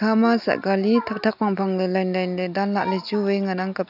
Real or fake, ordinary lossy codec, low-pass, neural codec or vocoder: real; none; 5.4 kHz; none